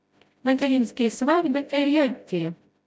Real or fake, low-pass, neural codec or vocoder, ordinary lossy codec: fake; none; codec, 16 kHz, 0.5 kbps, FreqCodec, smaller model; none